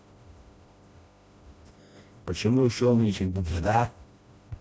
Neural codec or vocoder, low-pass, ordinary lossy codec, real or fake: codec, 16 kHz, 1 kbps, FreqCodec, smaller model; none; none; fake